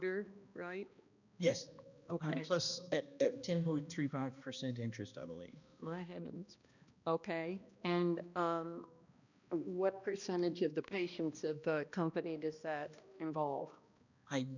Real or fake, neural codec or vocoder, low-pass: fake; codec, 16 kHz, 1 kbps, X-Codec, HuBERT features, trained on balanced general audio; 7.2 kHz